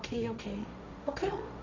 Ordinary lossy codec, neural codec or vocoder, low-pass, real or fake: none; codec, 16 kHz, 1.1 kbps, Voila-Tokenizer; 7.2 kHz; fake